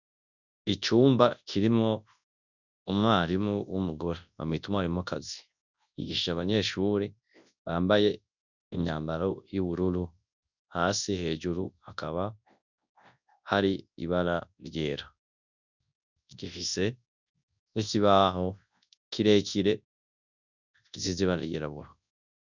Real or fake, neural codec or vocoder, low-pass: fake; codec, 24 kHz, 0.9 kbps, WavTokenizer, large speech release; 7.2 kHz